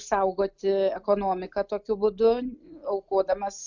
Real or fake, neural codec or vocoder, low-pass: real; none; 7.2 kHz